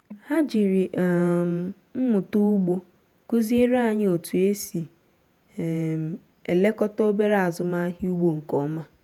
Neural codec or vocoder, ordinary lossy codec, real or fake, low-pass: vocoder, 48 kHz, 128 mel bands, Vocos; none; fake; 19.8 kHz